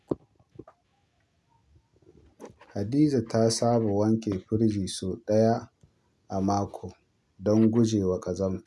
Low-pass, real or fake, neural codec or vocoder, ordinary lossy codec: none; real; none; none